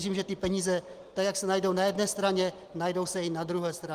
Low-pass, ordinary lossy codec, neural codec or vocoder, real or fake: 14.4 kHz; Opus, 24 kbps; none; real